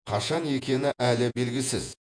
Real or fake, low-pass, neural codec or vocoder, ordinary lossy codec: fake; 9.9 kHz; vocoder, 48 kHz, 128 mel bands, Vocos; MP3, 64 kbps